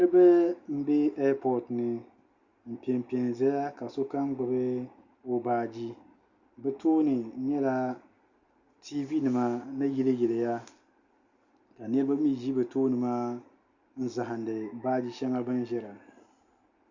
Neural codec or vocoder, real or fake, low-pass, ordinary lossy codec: none; real; 7.2 kHz; Opus, 64 kbps